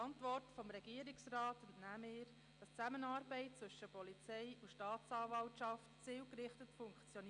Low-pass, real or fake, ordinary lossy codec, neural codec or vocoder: 9.9 kHz; real; Opus, 64 kbps; none